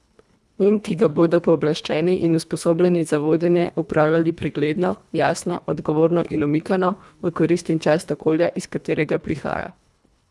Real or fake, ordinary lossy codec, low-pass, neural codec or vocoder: fake; none; none; codec, 24 kHz, 1.5 kbps, HILCodec